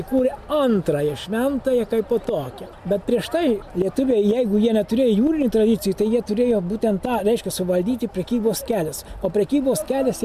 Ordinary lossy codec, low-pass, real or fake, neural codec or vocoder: MP3, 96 kbps; 14.4 kHz; real; none